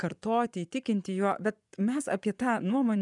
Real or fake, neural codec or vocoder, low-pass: fake; vocoder, 24 kHz, 100 mel bands, Vocos; 10.8 kHz